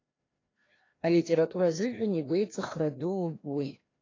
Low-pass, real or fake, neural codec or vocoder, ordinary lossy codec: 7.2 kHz; fake; codec, 16 kHz, 1 kbps, FreqCodec, larger model; AAC, 32 kbps